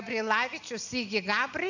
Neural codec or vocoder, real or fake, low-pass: none; real; 7.2 kHz